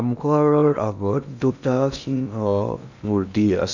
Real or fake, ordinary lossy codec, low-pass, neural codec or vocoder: fake; none; 7.2 kHz; codec, 16 kHz in and 24 kHz out, 0.6 kbps, FocalCodec, streaming, 2048 codes